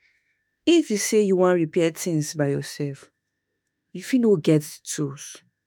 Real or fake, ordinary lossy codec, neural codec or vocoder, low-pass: fake; none; autoencoder, 48 kHz, 32 numbers a frame, DAC-VAE, trained on Japanese speech; none